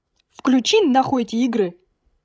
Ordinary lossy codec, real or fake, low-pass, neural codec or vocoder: none; fake; none; codec, 16 kHz, 16 kbps, FreqCodec, larger model